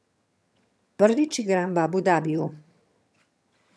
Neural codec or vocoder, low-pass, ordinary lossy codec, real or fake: vocoder, 22.05 kHz, 80 mel bands, HiFi-GAN; none; none; fake